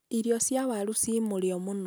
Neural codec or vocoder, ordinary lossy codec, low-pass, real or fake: none; none; none; real